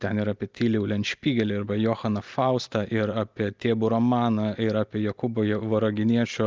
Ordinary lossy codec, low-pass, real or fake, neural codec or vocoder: Opus, 32 kbps; 7.2 kHz; real; none